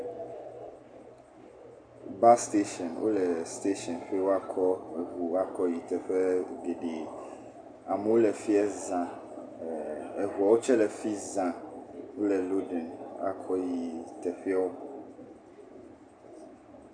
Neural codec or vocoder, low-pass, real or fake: none; 9.9 kHz; real